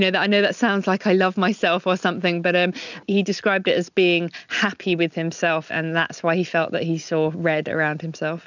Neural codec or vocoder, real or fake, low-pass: none; real; 7.2 kHz